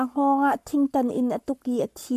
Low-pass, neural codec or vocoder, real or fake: 14.4 kHz; codec, 44.1 kHz, 7.8 kbps, Pupu-Codec; fake